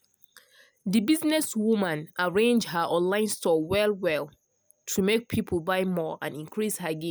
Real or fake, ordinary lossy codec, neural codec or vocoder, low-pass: real; none; none; none